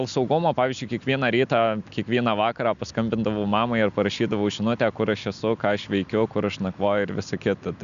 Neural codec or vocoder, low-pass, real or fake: none; 7.2 kHz; real